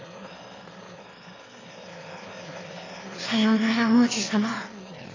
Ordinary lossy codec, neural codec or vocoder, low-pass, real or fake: AAC, 32 kbps; autoencoder, 22.05 kHz, a latent of 192 numbers a frame, VITS, trained on one speaker; 7.2 kHz; fake